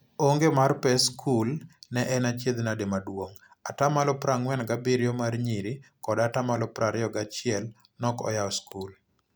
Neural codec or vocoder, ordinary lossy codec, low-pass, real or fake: none; none; none; real